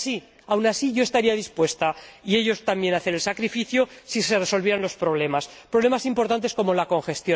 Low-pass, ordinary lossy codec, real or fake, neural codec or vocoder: none; none; real; none